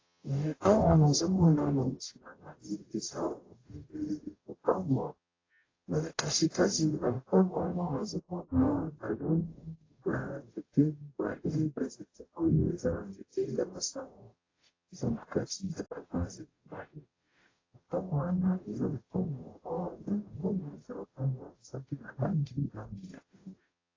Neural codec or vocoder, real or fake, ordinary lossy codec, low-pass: codec, 44.1 kHz, 0.9 kbps, DAC; fake; AAC, 32 kbps; 7.2 kHz